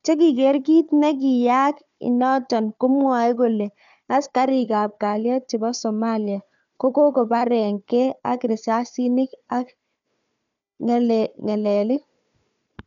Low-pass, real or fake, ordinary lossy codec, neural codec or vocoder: 7.2 kHz; fake; none; codec, 16 kHz, 4 kbps, FunCodec, trained on Chinese and English, 50 frames a second